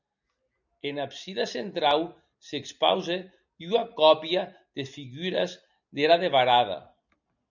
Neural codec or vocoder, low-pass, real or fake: none; 7.2 kHz; real